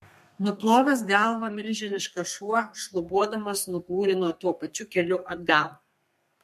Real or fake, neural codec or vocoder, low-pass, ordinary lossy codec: fake; codec, 32 kHz, 1.9 kbps, SNAC; 14.4 kHz; MP3, 64 kbps